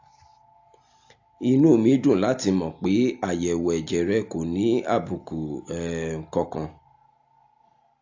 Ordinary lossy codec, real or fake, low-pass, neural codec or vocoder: AAC, 48 kbps; real; 7.2 kHz; none